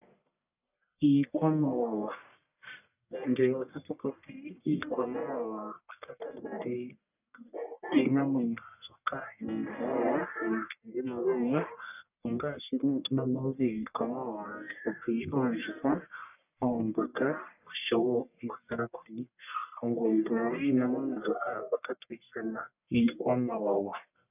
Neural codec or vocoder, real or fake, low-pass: codec, 44.1 kHz, 1.7 kbps, Pupu-Codec; fake; 3.6 kHz